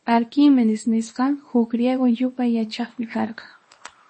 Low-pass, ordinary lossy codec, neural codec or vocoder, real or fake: 10.8 kHz; MP3, 32 kbps; codec, 24 kHz, 0.9 kbps, WavTokenizer, small release; fake